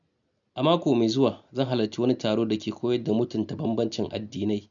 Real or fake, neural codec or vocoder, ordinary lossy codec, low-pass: real; none; MP3, 96 kbps; 7.2 kHz